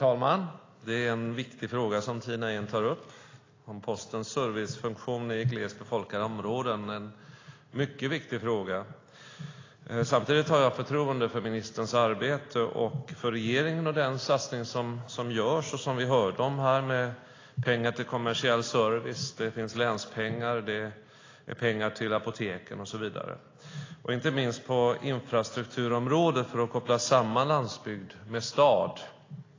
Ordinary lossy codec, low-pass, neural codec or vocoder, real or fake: AAC, 32 kbps; 7.2 kHz; none; real